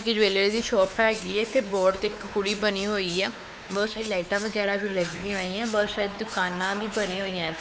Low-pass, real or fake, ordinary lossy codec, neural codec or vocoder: none; fake; none; codec, 16 kHz, 4 kbps, X-Codec, WavLM features, trained on Multilingual LibriSpeech